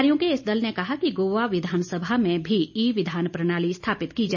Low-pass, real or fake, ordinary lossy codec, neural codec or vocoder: 7.2 kHz; real; none; none